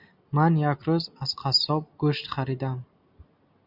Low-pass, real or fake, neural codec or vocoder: 5.4 kHz; real; none